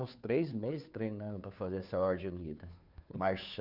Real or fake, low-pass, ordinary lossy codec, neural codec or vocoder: fake; 5.4 kHz; none; codec, 16 kHz, 2 kbps, FunCodec, trained on Chinese and English, 25 frames a second